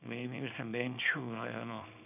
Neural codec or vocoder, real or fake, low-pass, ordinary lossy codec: codec, 24 kHz, 0.9 kbps, WavTokenizer, small release; fake; 3.6 kHz; none